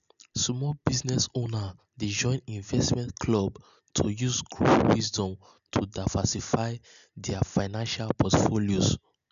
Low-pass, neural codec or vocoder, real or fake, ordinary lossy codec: 7.2 kHz; none; real; none